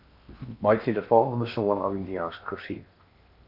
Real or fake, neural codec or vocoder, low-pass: fake; codec, 16 kHz in and 24 kHz out, 0.8 kbps, FocalCodec, streaming, 65536 codes; 5.4 kHz